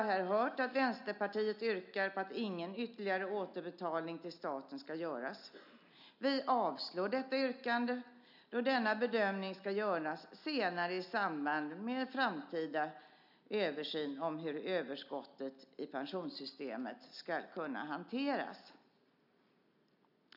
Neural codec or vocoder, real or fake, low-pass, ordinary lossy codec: none; real; 5.4 kHz; none